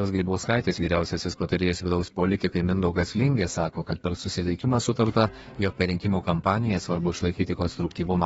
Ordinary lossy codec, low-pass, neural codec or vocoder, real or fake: AAC, 24 kbps; 14.4 kHz; codec, 32 kHz, 1.9 kbps, SNAC; fake